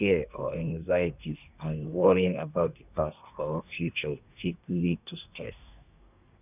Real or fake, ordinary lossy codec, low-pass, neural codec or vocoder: fake; none; 3.6 kHz; codec, 24 kHz, 1 kbps, SNAC